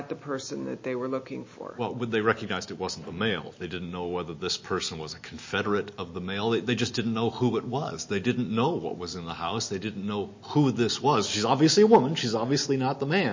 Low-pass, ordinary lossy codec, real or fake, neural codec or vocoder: 7.2 kHz; MP3, 32 kbps; real; none